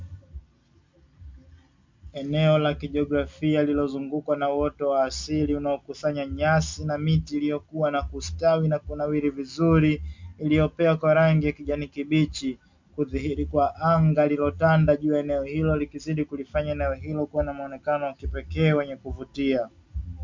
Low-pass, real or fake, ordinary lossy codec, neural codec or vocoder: 7.2 kHz; real; MP3, 64 kbps; none